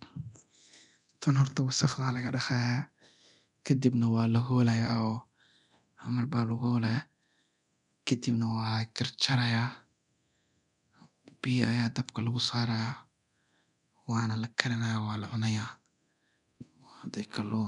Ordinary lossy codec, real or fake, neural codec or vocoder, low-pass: none; fake; codec, 24 kHz, 0.9 kbps, DualCodec; 10.8 kHz